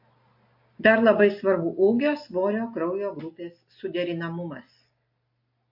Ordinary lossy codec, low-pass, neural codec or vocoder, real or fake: MP3, 32 kbps; 5.4 kHz; none; real